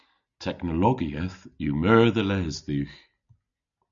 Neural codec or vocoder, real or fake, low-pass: none; real; 7.2 kHz